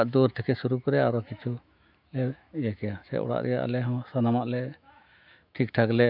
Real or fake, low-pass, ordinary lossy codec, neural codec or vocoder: fake; 5.4 kHz; none; autoencoder, 48 kHz, 128 numbers a frame, DAC-VAE, trained on Japanese speech